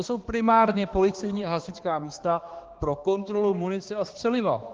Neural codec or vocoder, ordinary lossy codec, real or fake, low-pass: codec, 16 kHz, 2 kbps, X-Codec, HuBERT features, trained on balanced general audio; Opus, 16 kbps; fake; 7.2 kHz